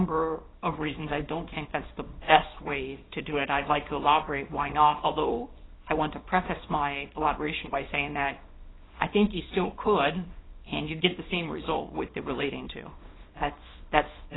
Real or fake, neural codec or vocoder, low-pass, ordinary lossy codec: fake; codec, 24 kHz, 0.9 kbps, WavTokenizer, small release; 7.2 kHz; AAC, 16 kbps